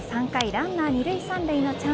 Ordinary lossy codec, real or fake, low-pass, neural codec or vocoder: none; real; none; none